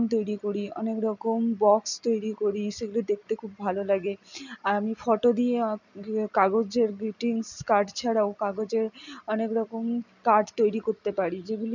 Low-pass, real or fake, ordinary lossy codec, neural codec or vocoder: 7.2 kHz; real; none; none